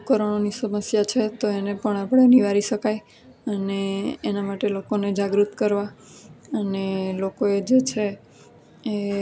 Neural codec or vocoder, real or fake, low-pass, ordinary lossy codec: none; real; none; none